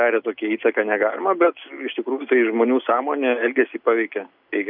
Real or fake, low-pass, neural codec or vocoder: real; 5.4 kHz; none